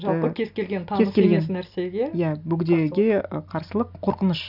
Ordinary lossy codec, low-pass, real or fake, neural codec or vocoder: none; 5.4 kHz; real; none